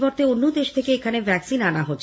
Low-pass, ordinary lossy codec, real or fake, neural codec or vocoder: none; none; real; none